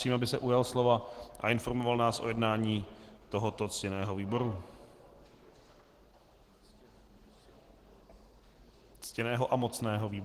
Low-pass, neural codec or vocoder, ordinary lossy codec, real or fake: 14.4 kHz; none; Opus, 16 kbps; real